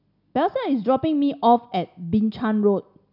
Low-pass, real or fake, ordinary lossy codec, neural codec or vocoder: 5.4 kHz; real; none; none